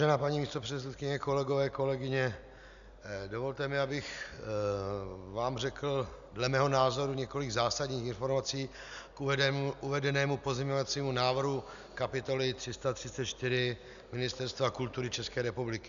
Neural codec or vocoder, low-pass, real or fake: none; 7.2 kHz; real